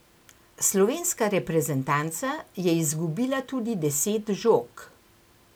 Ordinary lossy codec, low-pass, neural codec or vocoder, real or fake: none; none; none; real